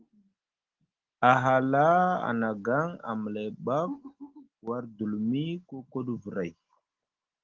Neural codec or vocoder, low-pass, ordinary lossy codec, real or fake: none; 7.2 kHz; Opus, 16 kbps; real